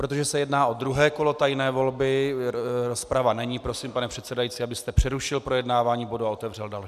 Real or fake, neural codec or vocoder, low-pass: real; none; 14.4 kHz